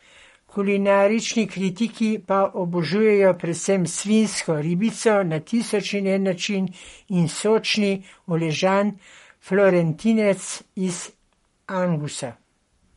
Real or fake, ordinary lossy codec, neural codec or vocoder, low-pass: fake; MP3, 48 kbps; codec, 44.1 kHz, 7.8 kbps, Pupu-Codec; 19.8 kHz